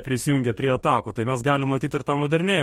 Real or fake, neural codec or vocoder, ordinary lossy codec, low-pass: fake; codec, 44.1 kHz, 2.6 kbps, DAC; MP3, 64 kbps; 19.8 kHz